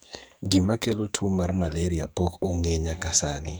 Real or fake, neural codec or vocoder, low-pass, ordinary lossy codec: fake; codec, 44.1 kHz, 2.6 kbps, SNAC; none; none